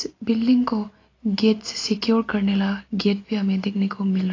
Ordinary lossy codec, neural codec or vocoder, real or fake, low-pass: AAC, 32 kbps; none; real; 7.2 kHz